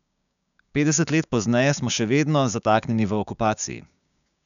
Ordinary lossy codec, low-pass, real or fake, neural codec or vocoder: none; 7.2 kHz; fake; codec, 16 kHz, 6 kbps, DAC